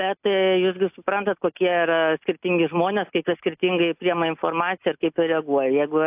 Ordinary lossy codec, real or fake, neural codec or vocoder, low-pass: AAC, 32 kbps; real; none; 3.6 kHz